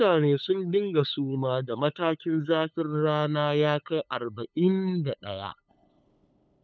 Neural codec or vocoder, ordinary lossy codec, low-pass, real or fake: codec, 16 kHz, 8 kbps, FunCodec, trained on LibriTTS, 25 frames a second; none; none; fake